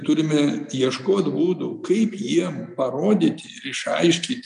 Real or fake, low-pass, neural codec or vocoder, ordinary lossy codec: real; 10.8 kHz; none; MP3, 96 kbps